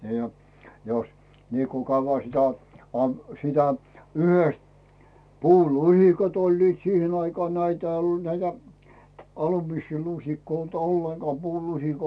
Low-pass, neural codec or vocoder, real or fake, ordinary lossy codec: none; none; real; none